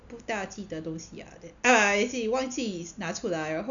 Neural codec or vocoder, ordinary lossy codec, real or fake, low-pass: none; none; real; 7.2 kHz